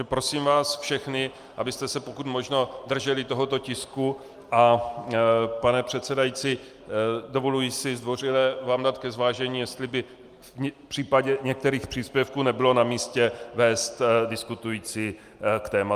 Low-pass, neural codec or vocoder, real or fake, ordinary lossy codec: 14.4 kHz; none; real; Opus, 32 kbps